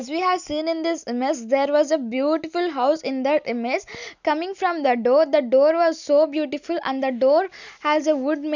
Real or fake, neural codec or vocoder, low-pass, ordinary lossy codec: real; none; 7.2 kHz; none